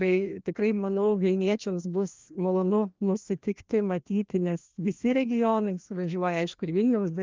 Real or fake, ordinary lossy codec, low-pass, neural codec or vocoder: fake; Opus, 32 kbps; 7.2 kHz; codec, 16 kHz, 1 kbps, FreqCodec, larger model